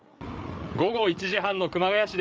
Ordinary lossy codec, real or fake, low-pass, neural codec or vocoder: none; fake; none; codec, 16 kHz, 8 kbps, FreqCodec, larger model